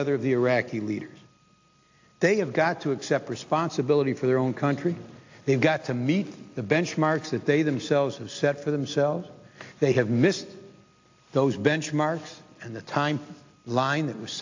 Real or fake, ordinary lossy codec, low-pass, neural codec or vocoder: real; AAC, 48 kbps; 7.2 kHz; none